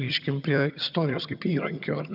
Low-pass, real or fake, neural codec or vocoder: 5.4 kHz; fake; vocoder, 22.05 kHz, 80 mel bands, HiFi-GAN